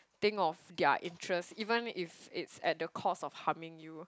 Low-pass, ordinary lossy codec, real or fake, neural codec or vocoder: none; none; real; none